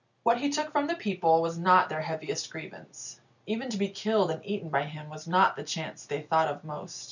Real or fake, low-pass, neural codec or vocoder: real; 7.2 kHz; none